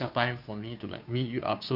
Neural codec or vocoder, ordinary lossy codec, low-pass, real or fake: codec, 16 kHz, 2 kbps, FunCodec, trained on Chinese and English, 25 frames a second; none; 5.4 kHz; fake